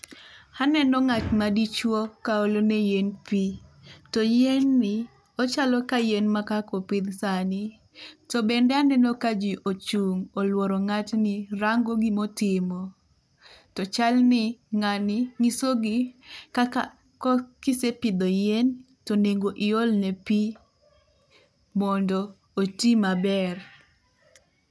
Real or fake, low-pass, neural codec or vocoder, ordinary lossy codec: real; none; none; none